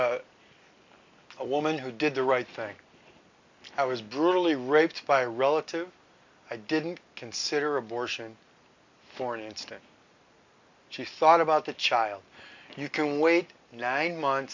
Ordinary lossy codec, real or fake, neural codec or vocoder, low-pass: MP3, 64 kbps; real; none; 7.2 kHz